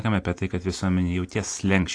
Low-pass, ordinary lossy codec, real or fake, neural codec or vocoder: 9.9 kHz; AAC, 48 kbps; real; none